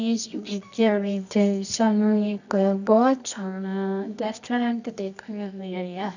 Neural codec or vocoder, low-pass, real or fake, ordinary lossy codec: codec, 24 kHz, 0.9 kbps, WavTokenizer, medium music audio release; 7.2 kHz; fake; none